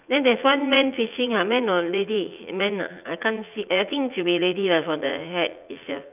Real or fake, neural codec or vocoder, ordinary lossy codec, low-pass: fake; vocoder, 44.1 kHz, 80 mel bands, Vocos; none; 3.6 kHz